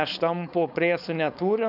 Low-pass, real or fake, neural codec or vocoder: 5.4 kHz; fake; codec, 16 kHz, 4.8 kbps, FACodec